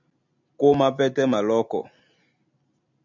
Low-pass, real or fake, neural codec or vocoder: 7.2 kHz; real; none